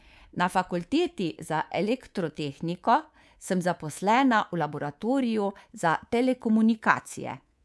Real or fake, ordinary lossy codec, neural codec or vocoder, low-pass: fake; none; codec, 24 kHz, 3.1 kbps, DualCodec; none